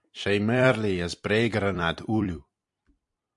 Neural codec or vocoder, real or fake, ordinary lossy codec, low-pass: vocoder, 44.1 kHz, 128 mel bands every 256 samples, BigVGAN v2; fake; MP3, 64 kbps; 10.8 kHz